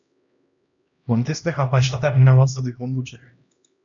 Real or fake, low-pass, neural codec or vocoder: fake; 7.2 kHz; codec, 16 kHz, 1 kbps, X-Codec, HuBERT features, trained on LibriSpeech